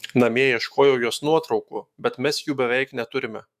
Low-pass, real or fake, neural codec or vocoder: 14.4 kHz; fake; autoencoder, 48 kHz, 128 numbers a frame, DAC-VAE, trained on Japanese speech